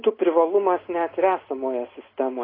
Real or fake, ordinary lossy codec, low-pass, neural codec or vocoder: real; AAC, 24 kbps; 5.4 kHz; none